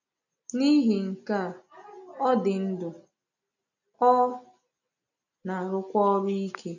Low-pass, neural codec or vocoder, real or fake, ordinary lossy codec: 7.2 kHz; none; real; none